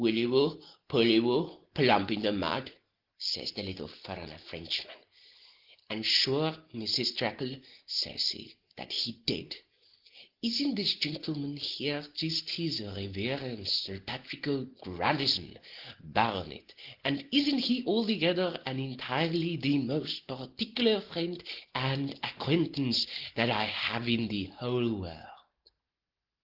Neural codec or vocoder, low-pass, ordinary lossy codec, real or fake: none; 5.4 kHz; Opus, 16 kbps; real